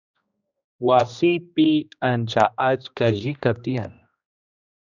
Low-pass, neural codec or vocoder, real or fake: 7.2 kHz; codec, 16 kHz, 1 kbps, X-Codec, HuBERT features, trained on general audio; fake